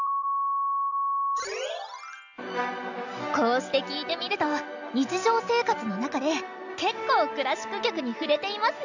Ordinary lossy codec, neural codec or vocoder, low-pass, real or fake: none; none; 7.2 kHz; real